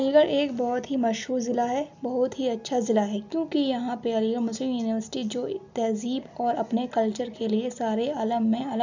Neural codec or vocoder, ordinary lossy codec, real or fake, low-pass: none; none; real; 7.2 kHz